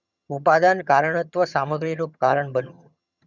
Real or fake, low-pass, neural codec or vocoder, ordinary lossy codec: fake; 7.2 kHz; vocoder, 22.05 kHz, 80 mel bands, HiFi-GAN; none